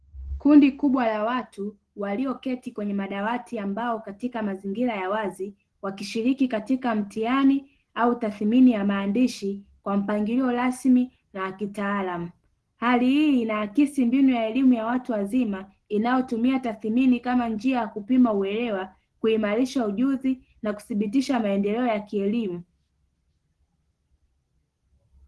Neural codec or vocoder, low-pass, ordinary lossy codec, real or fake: none; 10.8 kHz; Opus, 16 kbps; real